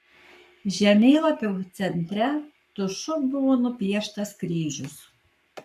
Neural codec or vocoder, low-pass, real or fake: codec, 44.1 kHz, 7.8 kbps, Pupu-Codec; 14.4 kHz; fake